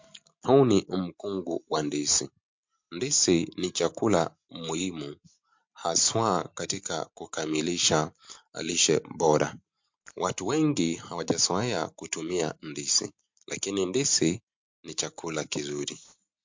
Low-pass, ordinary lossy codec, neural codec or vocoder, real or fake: 7.2 kHz; MP3, 48 kbps; none; real